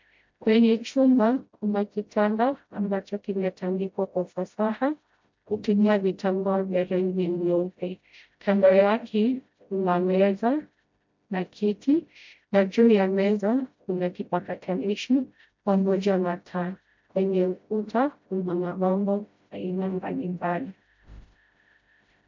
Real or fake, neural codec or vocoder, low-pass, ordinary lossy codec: fake; codec, 16 kHz, 0.5 kbps, FreqCodec, smaller model; 7.2 kHz; MP3, 48 kbps